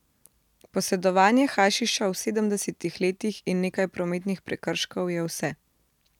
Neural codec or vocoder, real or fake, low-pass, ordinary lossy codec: none; real; 19.8 kHz; none